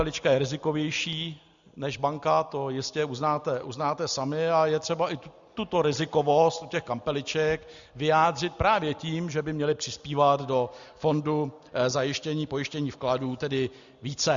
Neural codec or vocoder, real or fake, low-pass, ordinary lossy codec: none; real; 7.2 kHz; Opus, 64 kbps